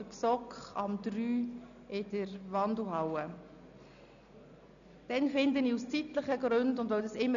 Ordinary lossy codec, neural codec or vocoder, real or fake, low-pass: none; none; real; 7.2 kHz